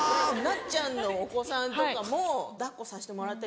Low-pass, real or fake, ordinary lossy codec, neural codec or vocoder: none; real; none; none